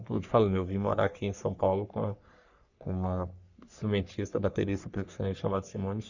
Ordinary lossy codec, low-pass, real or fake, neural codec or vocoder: none; 7.2 kHz; fake; codec, 44.1 kHz, 3.4 kbps, Pupu-Codec